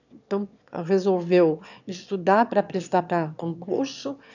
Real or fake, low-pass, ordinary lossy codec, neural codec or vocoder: fake; 7.2 kHz; none; autoencoder, 22.05 kHz, a latent of 192 numbers a frame, VITS, trained on one speaker